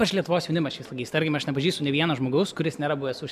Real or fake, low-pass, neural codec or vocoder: real; 14.4 kHz; none